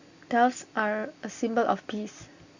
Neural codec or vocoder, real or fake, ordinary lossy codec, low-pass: none; real; Opus, 64 kbps; 7.2 kHz